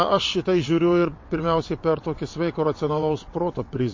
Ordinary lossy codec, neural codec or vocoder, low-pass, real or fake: MP3, 32 kbps; vocoder, 22.05 kHz, 80 mel bands, WaveNeXt; 7.2 kHz; fake